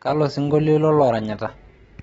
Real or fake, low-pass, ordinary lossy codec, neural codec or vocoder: real; 19.8 kHz; AAC, 24 kbps; none